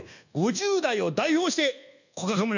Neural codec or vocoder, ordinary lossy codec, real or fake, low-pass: codec, 24 kHz, 1.2 kbps, DualCodec; none; fake; 7.2 kHz